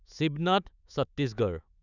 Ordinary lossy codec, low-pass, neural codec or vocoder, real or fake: none; 7.2 kHz; autoencoder, 48 kHz, 128 numbers a frame, DAC-VAE, trained on Japanese speech; fake